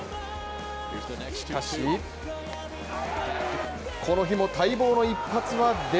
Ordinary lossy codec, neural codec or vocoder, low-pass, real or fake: none; none; none; real